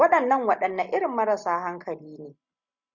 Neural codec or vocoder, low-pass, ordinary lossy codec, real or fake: none; 7.2 kHz; Opus, 64 kbps; real